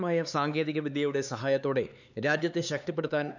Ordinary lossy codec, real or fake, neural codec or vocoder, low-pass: none; fake; codec, 16 kHz, 4 kbps, X-Codec, HuBERT features, trained on LibriSpeech; 7.2 kHz